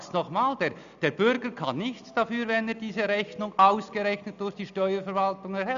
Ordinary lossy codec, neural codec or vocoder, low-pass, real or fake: none; none; 7.2 kHz; real